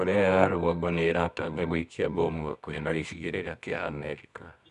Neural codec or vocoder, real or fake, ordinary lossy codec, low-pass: codec, 24 kHz, 0.9 kbps, WavTokenizer, medium music audio release; fake; none; 10.8 kHz